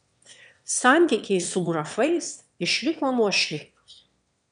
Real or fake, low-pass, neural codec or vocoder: fake; 9.9 kHz; autoencoder, 22.05 kHz, a latent of 192 numbers a frame, VITS, trained on one speaker